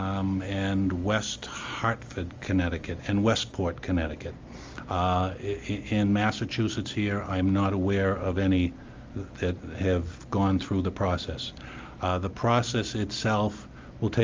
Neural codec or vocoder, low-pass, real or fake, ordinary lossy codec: none; 7.2 kHz; real; Opus, 32 kbps